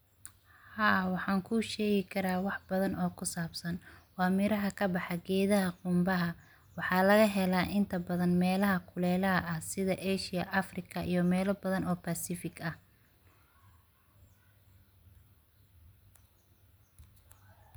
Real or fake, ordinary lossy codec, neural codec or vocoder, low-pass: real; none; none; none